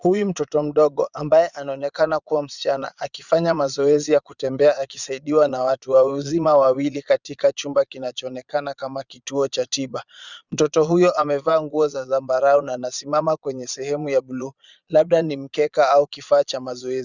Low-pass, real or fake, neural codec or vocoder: 7.2 kHz; fake; vocoder, 22.05 kHz, 80 mel bands, Vocos